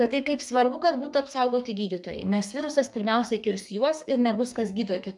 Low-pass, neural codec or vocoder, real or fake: 10.8 kHz; codec, 32 kHz, 1.9 kbps, SNAC; fake